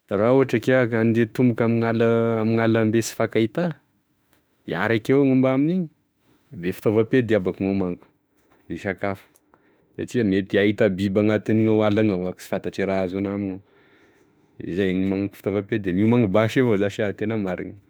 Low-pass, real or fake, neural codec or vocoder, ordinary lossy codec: none; fake; autoencoder, 48 kHz, 32 numbers a frame, DAC-VAE, trained on Japanese speech; none